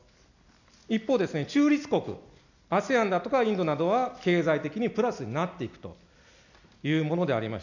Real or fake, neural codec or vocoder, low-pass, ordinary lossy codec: real; none; 7.2 kHz; none